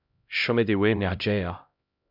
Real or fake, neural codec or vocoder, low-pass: fake; codec, 16 kHz, 0.5 kbps, X-Codec, HuBERT features, trained on LibriSpeech; 5.4 kHz